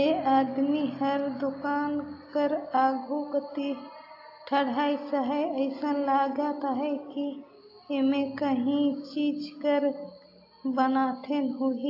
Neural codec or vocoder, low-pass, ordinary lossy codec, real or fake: none; 5.4 kHz; AAC, 32 kbps; real